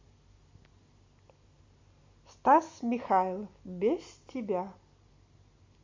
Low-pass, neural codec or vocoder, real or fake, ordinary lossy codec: 7.2 kHz; none; real; MP3, 32 kbps